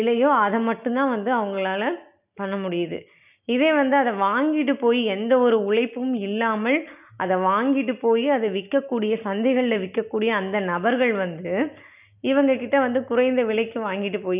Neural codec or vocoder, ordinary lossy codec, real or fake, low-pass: none; none; real; 3.6 kHz